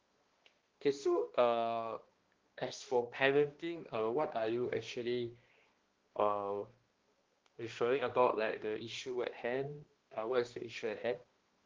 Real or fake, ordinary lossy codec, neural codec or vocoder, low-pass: fake; Opus, 16 kbps; codec, 16 kHz, 1 kbps, X-Codec, HuBERT features, trained on balanced general audio; 7.2 kHz